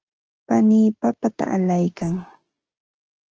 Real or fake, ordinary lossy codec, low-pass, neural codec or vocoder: fake; Opus, 16 kbps; 7.2 kHz; codec, 16 kHz, 6 kbps, DAC